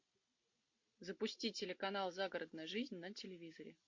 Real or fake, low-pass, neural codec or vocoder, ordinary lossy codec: real; 7.2 kHz; none; MP3, 48 kbps